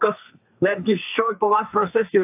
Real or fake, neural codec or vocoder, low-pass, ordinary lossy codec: fake; codec, 32 kHz, 1.9 kbps, SNAC; 3.6 kHz; AAC, 32 kbps